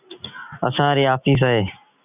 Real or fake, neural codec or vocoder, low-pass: real; none; 3.6 kHz